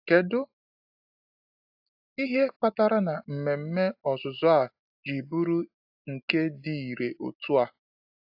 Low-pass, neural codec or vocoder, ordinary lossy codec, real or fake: 5.4 kHz; none; AAC, 48 kbps; real